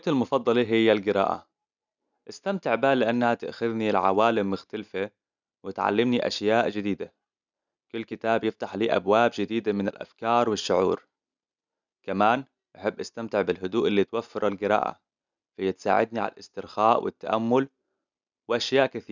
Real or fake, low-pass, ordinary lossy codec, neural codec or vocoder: real; 7.2 kHz; none; none